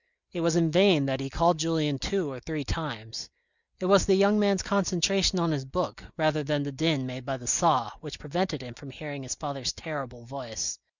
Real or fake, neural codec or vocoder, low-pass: real; none; 7.2 kHz